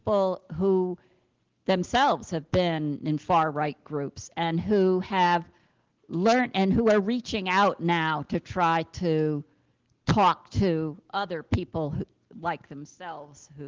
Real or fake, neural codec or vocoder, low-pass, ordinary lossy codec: real; none; 7.2 kHz; Opus, 16 kbps